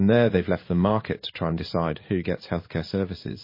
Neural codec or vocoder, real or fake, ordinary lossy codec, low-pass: codec, 16 kHz in and 24 kHz out, 1 kbps, XY-Tokenizer; fake; MP3, 24 kbps; 5.4 kHz